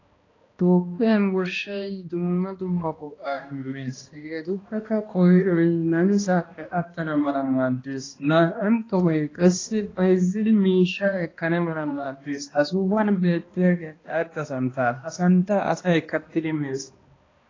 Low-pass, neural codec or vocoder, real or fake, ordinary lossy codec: 7.2 kHz; codec, 16 kHz, 1 kbps, X-Codec, HuBERT features, trained on balanced general audio; fake; AAC, 32 kbps